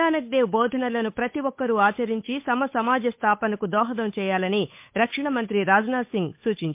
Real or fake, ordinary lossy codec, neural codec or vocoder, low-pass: fake; MP3, 32 kbps; codec, 16 kHz, 8 kbps, FunCodec, trained on Chinese and English, 25 frames a second; 3.6 kHz